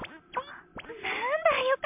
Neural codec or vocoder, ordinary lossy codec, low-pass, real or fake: none; MP3, 32 kbps; 3.6 kHz; real